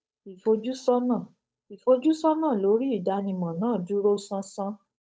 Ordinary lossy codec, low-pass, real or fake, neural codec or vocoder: none; none; fake; codec, 16 kHz, 8 kbps, FunCodec, trained on Chinese and English, 25 frames a second